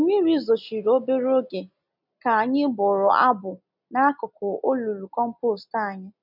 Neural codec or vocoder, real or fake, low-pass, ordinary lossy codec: none; real; 5.4 kHz; none